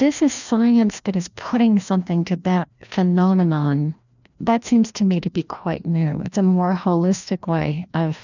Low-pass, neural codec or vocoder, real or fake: 7.2 kHz; codec, 16 kHz, 1 kbps, FreqCodec, larger model; fake